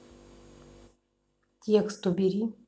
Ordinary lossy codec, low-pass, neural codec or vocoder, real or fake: none; none; none; real